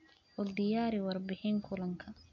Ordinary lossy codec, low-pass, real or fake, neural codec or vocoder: AAC, 48 kbps; 7.2 kHz; real; none